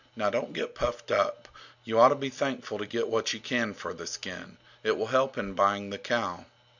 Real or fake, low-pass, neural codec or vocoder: real; 7.2 kHz; none